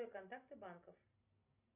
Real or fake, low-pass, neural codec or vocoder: real; 3.6 kHz; none